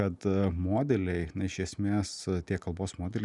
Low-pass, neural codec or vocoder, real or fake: 10.8 kHz; none; real